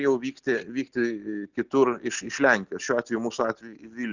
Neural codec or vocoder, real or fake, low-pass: none; real; 7.2 kHz